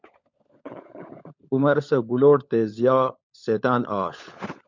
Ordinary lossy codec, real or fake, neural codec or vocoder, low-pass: MP3, 64 kbps; fake; codec, 16 kHz, 16 kbps, FunCodec, trained on LibriTTS, 50 frames a second; 7.2 kHz